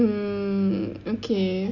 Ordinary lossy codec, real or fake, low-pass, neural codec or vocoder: none; real; 7.2 kHz; none